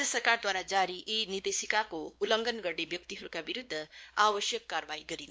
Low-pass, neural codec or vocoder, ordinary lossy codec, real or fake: none; codec, 16 kHz, 2 kbps, X-Codec, WavLM features, trained on Multilingual LibriSpeech; none; fake